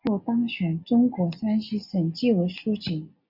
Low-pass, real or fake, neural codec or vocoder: 5.4 kHz; real; none